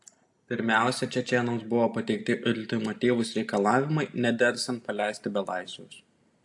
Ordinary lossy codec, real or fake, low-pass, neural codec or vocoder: AAC, 64 kbps; real; 10.8 kHz; none